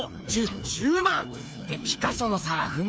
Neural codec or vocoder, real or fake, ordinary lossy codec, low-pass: codec, 16 kHz, 2 kbps, FreqCodec, larger model; fake; none; none